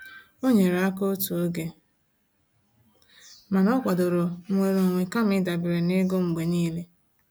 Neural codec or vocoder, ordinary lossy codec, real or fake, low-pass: none; none; real; none